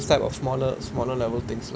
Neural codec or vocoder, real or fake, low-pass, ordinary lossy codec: none; real; none; none